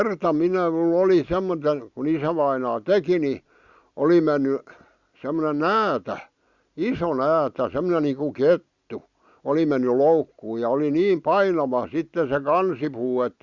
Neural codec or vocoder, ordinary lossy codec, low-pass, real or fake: none; Opus, 64 kbps; 7.2 kHz; real